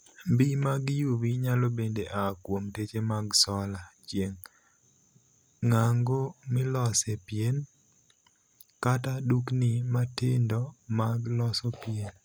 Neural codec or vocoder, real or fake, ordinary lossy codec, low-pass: none; real; none; none